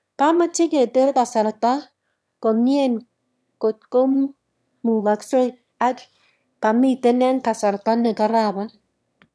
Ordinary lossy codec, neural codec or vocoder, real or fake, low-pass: none; autoencoder, 22.05 kHz, a latent of 192 numbers a frame, VITS, trained on one speaker; fake; none